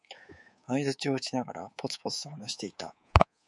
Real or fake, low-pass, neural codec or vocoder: fake; 10.8 kHz; codec, 24 kHz, 3.1 kbps, DualCodec